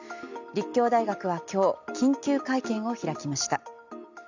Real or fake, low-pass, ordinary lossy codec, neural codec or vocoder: real; 7.2 kHz; none; none